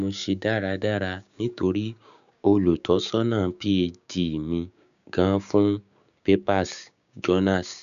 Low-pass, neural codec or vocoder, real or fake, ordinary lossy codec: 7.2 kHz; codec, 16 kHz, 6 kbps, DAC; fake; none